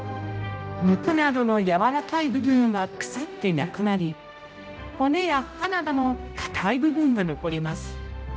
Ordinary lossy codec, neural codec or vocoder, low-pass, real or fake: none; codec, 16 kHz, 0.5 kbps, X-Codec, HuBERT features, trained on general audio; none; fake